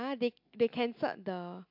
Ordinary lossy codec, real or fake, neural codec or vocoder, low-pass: MP3, 48 kbps; real; none; 5.4 kHz